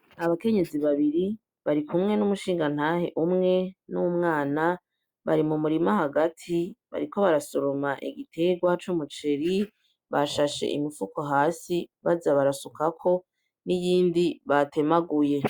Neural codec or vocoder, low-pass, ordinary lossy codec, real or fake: none; 19.8 kHz; Opus, 64 kbps; real